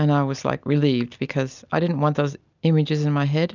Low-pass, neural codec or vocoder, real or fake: 7.2 kHz; none; real